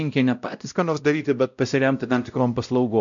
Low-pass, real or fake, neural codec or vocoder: 7.2 kHz; fake; codec, 16 kHz, 0.5 kbps, X-Codec, WavLM features, trained on Multilingual LibriSpeech